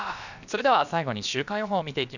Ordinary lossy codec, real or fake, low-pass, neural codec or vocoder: none; fake; 7.2 kHz; codec, 16 kHz, about 1 kbps, DyCAST, with the encoder's durations